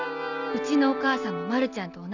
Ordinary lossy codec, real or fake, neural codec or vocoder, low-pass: none; real; none; 7.2 kHz